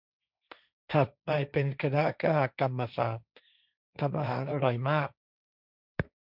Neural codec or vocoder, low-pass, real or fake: codec, 16 kHz, 1.1 kbps, Voila-Tokenizer; 5.4 kHz; fake